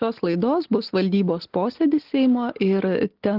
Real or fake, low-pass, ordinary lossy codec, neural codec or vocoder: real; 5.4 kHz; Opus, 16 kbps; none